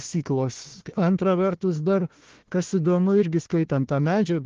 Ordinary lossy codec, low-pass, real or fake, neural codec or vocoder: Opus, 16 kbps; 7.2 kHz; fake; codec, 16 kHz, 1 kbps, FunCodec, trained on Chinese and English, 50 frames a second